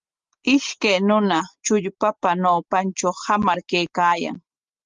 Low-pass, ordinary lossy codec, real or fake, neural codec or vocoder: 7.2 kHz; Opus, 32 kbps; real; none